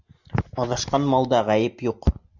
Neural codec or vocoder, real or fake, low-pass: none; real; 7.2 kHz